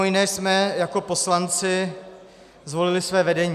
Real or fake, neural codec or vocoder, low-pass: real; none; 14.4 kHz